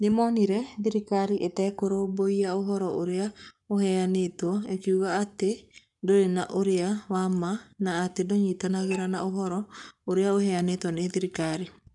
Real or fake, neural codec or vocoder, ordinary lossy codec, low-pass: fake; codec, 44.1 kHz, 7.8 kbps, Pupu-Codec; none; 10.8 kHz